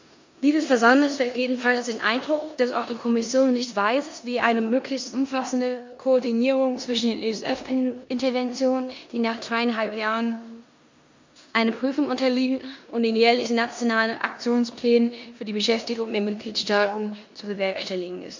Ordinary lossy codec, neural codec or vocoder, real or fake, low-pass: MP3, 48 kbps; codec, 16 kHz in and 24 kHz out, 0.9 kbps, LongCat-Audio-Codec, four codebook decoder; fake; 7.2 kHz